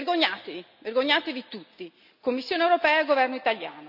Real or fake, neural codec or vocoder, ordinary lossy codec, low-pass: real; none; none; 5.4 kHz